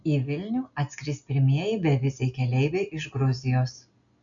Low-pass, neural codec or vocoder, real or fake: 7.2 kHz; none; real